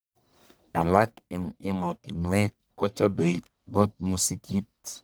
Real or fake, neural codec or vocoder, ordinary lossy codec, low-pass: fake; codec, 44.1 kHz, 1.7 kbps, Pupu-Codec; none; none